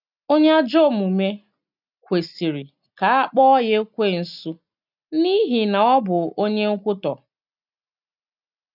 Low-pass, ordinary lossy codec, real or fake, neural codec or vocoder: 5.4 kHz; none; real; none